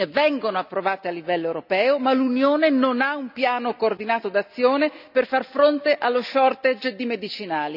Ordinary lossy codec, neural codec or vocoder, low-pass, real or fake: none; none; 5.4 kHz; real